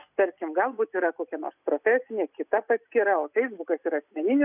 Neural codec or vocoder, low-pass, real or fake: none; 3.6 kHz; real